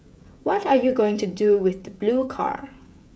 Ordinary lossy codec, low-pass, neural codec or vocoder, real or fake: none; none; codec, 16 kHz, 16 kbps, FreqCodec, smaller model; fake